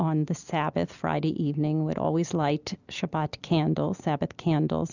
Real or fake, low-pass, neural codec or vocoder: real; 7.2 kHz; none